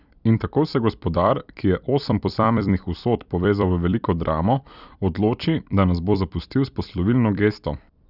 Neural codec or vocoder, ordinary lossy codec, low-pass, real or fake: vocoder, 44.1 kHz, 128 mel bands every 256 samples, BigVGAN v2; none; 5.4 kHz; fake